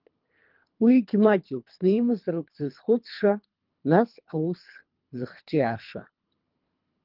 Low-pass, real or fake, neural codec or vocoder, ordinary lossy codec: 5.4 kHz; fake; codec, 24 kHz, 3 kbps, HILCodec; Opus, 24 kbps